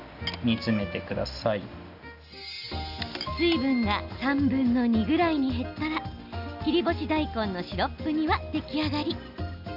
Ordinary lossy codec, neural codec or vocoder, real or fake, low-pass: none; none; real; 5.4 kHz